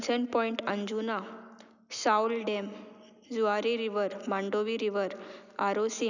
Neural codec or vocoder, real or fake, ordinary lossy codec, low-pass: none; real; none; 7.2 kHz